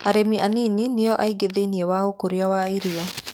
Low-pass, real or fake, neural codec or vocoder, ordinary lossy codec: none; fake; codec, 44.1 kHz, 7.8 kbps, DAC; none